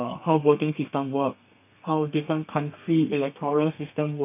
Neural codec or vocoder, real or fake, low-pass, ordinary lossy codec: codec, 32 kHz, 1.9 kbps, SNAC; fake; 3.6 kHz; none